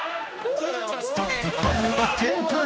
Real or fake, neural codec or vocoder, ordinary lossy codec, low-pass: fake; codec, 16 kHz, 1 kbps, X-Codec, HuBERT features, trained on general audio; none; none